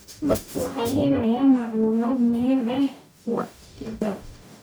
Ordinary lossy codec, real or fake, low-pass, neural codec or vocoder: none; fake; none; codec, 44.1 kHz, 0.9 kbps, DAC